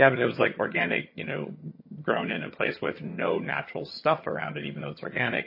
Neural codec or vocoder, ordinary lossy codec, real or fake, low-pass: vocoder, 22.05 kHz, 80 mel bands, HiFi-GAN; MP3, 24 kbps; fake; 5.4 kHz